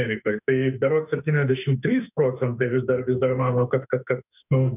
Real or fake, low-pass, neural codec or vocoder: fake; 3.6 kHz; autoencoder, 48 kHz, 32 numbers a frame, DAC-VAE, trained on Japanese speech